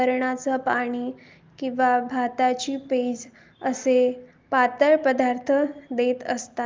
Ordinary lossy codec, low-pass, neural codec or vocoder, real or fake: Opus, 32 kbps; 7.2 kHz; none; real